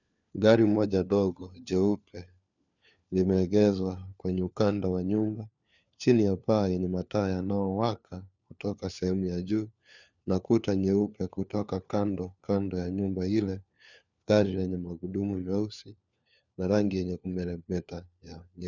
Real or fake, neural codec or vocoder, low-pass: fake; codec, 16 kHz, 4 kbps, FunCodec, trained on LibriTTS, 50 frames a second; 7.2 kHz